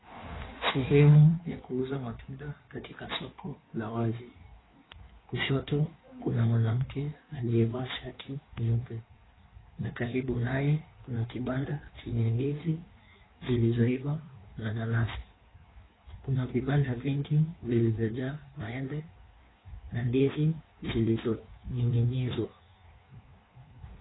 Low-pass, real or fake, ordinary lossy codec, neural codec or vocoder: 7.2 kHz; fake; AAC, 16 kbps; codec, 16 kHz in and 24 kHz out, 1.1 kbps, FireRedTTS-2 codec